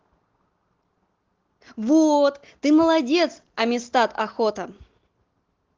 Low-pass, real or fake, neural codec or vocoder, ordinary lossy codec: 7.2 kHz; real; none; Opus, 16 kbps